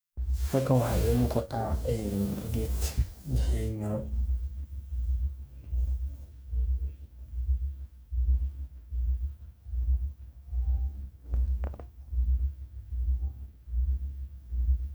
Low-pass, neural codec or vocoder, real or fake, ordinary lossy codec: none; codec, 44.1 kHz, 2.6 kbps, DAC; fake; none